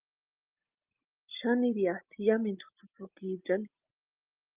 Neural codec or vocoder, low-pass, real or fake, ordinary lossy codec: none; 3.6 kHz; real; Opus, 24 kbps